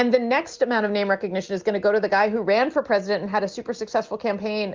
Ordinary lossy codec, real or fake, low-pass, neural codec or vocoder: Opus, 24 kbps; real; 7.2 kHz; none